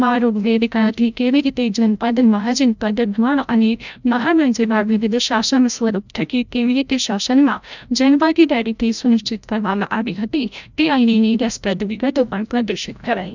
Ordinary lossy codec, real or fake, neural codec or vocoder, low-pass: none; fake; codec, 16 kHz, 0.5 kbps, FreqCodec, larger model; 7.2 kHz